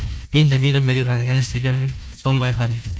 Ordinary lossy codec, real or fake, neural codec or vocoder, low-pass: none; fake; codec, 16 kHz, 1 kbps, FunCodec, trained on Chinese and English, 50 frames a second; none